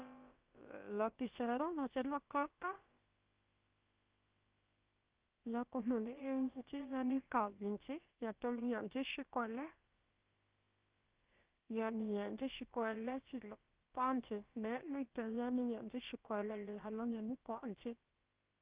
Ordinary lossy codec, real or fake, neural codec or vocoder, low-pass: Opus, 32 kbps; fake; codec, 16 kHz, about 1 kbps, DyCAST, with the encoder's durations; 3.6 kHz